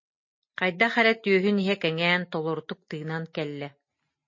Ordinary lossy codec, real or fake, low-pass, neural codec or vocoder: MP3, 32 kbps; real; 7.2 kHz; none